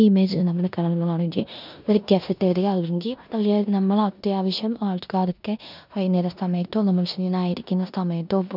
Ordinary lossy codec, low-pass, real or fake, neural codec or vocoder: none; 5.4 kHz; fake; codec, 16 kHz in and 24 kHz out, 0.9 kbps, LongCat-Audio-Codec, four codebook decoder